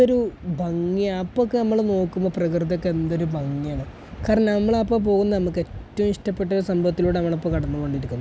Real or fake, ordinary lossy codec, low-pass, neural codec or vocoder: real; none; none; none